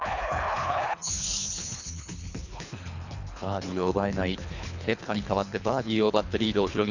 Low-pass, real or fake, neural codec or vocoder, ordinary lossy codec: 7.2 kHz; fake; codec, 24 kHz, 3 kbps, HILCodec; none